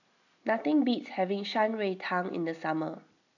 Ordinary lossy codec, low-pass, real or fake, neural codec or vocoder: none; 7.2 kHz; real; none